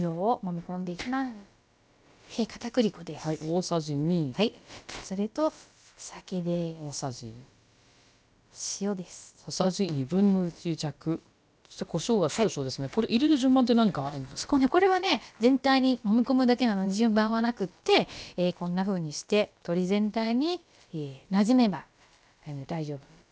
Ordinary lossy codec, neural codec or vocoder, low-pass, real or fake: none; codec, 16 kHz, about 1 kbps, DyCAST, with the encoder's durations; none; fake